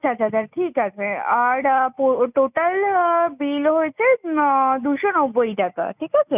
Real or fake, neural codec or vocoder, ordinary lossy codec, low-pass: real; none; none; 3.6 kHz